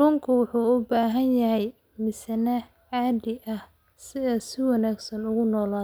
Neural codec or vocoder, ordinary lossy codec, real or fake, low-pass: none; none; real; none